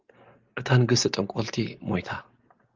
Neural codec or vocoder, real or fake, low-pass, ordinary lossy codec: none; real; 7.2 kHz; Opus, 24 kbps